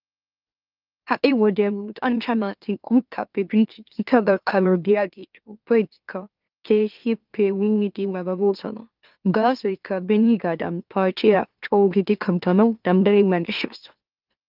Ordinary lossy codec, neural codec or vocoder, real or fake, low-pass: Opus, 24 kbps; autoencoder, 44.1 kHz, a latent of 192 numbers a frame, MeloTTS; fake; 5.4 kHz